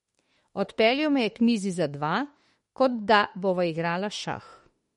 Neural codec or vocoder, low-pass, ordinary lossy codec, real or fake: autoencoder, 48 kHz, 32 numbers a frame, DAC-VAE, trained on Japanese speech; 19.8 kHz; MP3, 48 kbps; fake